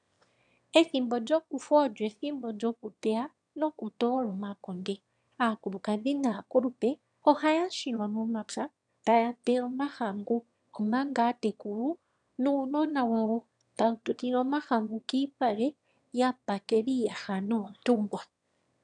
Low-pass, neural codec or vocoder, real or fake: 9.9 kHz; autoencoder, 22.05 kHz, a latent of 192 numbers a frame, VITS, trained on one speaker; fake